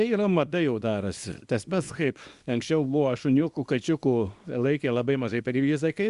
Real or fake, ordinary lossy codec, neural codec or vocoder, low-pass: fake; Opus, 64 kbps; codec, 24 kHz, 0.9 kbps, WavTokenizer, small release; 10.8 kHz